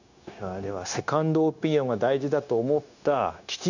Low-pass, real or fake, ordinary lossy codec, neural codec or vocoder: 7.2 kHz; fake; none; codec, 16 kHz, 0.9 kbps, LongCat-Audio-Codec